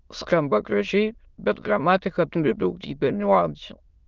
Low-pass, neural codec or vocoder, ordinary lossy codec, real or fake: 7.2 kHz; autoencoder, 22.05 kHz, a latent of 192 numbers a frame, VITS, trained on many speakers; Opus, 32 kbps; fake